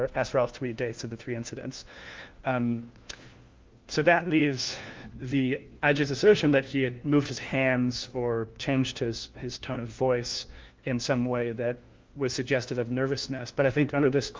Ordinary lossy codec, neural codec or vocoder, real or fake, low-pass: Opus, 32 kbps; codec, 16 kHz, 1 kbps, FunCodec, trained on LibriTTS, 50 frames a second; fake; 7.2 kHz